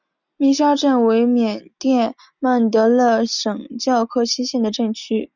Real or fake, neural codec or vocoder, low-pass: real; none; 7.2 kHz